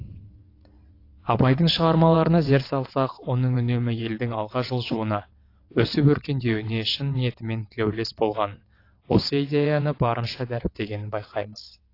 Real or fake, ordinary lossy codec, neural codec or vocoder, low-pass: fake; AAC, 32 kbps; vocoder, 22.05 kHz, 80 mel bands, WaveNeXt; 5.4 kHz